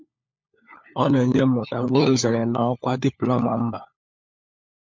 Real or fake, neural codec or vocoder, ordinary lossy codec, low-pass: fake; codec, 16 kHz, 4 kbps, FunCodec, trained on LibriTTS, 50 frames a second; MP3, 64 kbps; 7.2 kHz